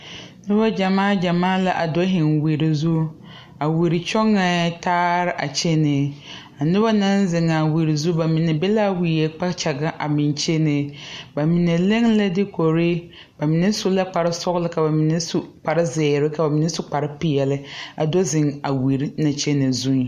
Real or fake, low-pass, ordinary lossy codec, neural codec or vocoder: real; 14.4 kHz; MP3, 64 kbps; none